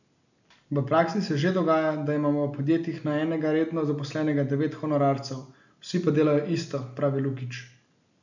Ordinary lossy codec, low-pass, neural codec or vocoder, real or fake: none; 7.2 kHz; none; real